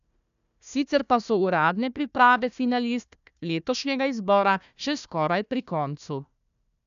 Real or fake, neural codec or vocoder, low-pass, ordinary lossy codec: fake; codec, 16 kHz, 1 kbps, FunCodec, trained on Chinese and English, 50 frames a second; 7.2 kHz; none